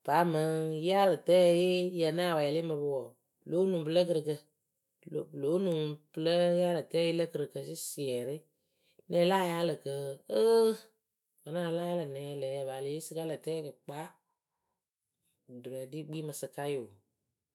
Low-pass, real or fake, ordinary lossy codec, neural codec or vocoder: 19.8 kHz; fake; none; autoencoder, 48 kHz, 128 numbers a frame, DAC-VAE, trained on Japanese speech